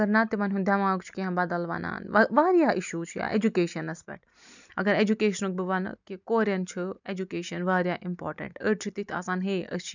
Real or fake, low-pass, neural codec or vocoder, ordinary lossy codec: real; 7.2 kHz; none; none